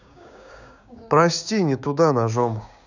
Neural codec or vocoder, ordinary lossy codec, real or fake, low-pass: codec, 16 kHz, 6 kbps, DAC; none; fake; 7.2 kHz